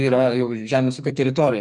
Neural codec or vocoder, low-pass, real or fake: codec, 44.1 kHz, 2.6 kbps, SNAC; 10.8 kHz; fake